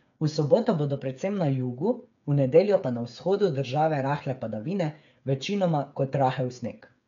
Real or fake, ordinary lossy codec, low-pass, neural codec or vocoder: fake; none; 7.2 kHz; codec, 16 kHz, 8 kbps, FreqCodec, smaller model